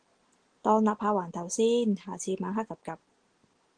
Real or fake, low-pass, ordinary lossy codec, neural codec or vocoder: real; 9.9 kHz; Opus, 16 kbps; none